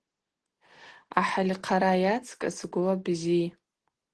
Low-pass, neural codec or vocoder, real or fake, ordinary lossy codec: 10.8 kHz; none; real; Opus, 16 kbps